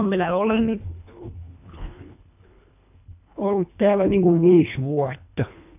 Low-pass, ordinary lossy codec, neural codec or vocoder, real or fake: 3.6 kHz; none; codec, 24 kHz, 1.5 kbps, HILCodec; fake